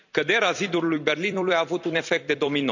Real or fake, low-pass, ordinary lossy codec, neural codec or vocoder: fake; 7.2 kHz; none; vocoder, 44.1 kHz, 128 mel bands every 256 samples, BigVGAN v2